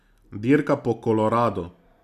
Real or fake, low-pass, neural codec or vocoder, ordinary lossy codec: real; 14.4 kHz; none; none